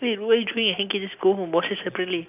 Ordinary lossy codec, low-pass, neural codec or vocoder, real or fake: none; 3.6 kHz; none; real